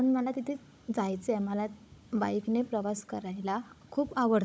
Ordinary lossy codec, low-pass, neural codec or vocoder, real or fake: none; none; codec, 16 kHz, 16 kbps, FunCodec, trained on Chinese and English, 50 frames a second; fake